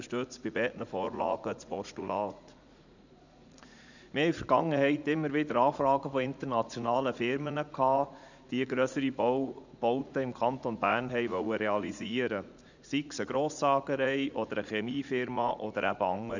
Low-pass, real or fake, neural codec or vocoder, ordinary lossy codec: 7.2 kHz; fake; vocoder, 44.1 kHz, 80 mel bands, Vocos; none